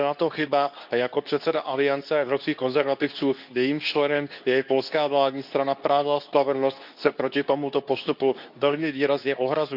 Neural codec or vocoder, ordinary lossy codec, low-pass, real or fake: codec, 24 kHz, 0.9 kbps, WavTokenizer, medium speech release version 2; none; 5.4 kHz; fake